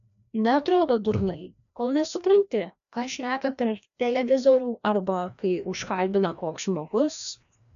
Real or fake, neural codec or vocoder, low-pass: fake; codec, 16 kHz, 1 kbps, FreqCodec, larger model; 7.2 kHz